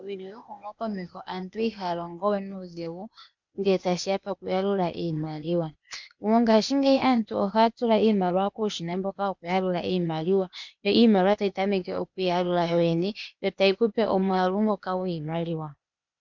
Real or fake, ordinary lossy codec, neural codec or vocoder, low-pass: fake; MP3, 64 kbps; codec, 16 kHz, 0.8 kbps, ZipCodec; 7.2 kHz